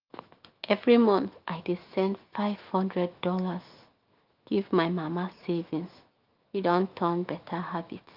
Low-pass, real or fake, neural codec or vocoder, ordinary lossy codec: 5.4 kHz; fake; autoencoder, 48 kHz, 128 numbers a frame, DAC-VAE, trained on Japanese speech; Opus, 32 kbps